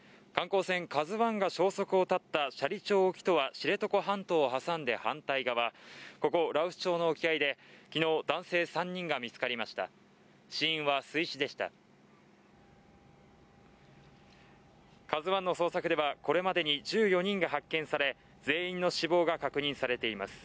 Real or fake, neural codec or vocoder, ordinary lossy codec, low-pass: real; none; none; none